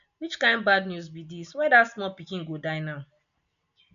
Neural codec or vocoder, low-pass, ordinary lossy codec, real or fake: none; 7.2 kHz; none; real